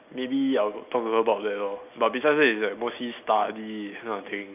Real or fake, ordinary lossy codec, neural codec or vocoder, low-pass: real; none; none; 3.6 kHz